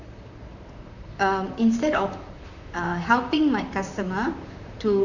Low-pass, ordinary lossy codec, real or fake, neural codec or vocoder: 7.2 kHz; none; fake; vocoder, 44.1 kHz, 128 mel bands, Pupu-Vocoder